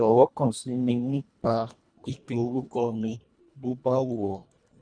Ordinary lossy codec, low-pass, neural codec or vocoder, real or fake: none; 9.9 kHz; codec, 24 kHz, 1.5 kbps, HILCodec; fake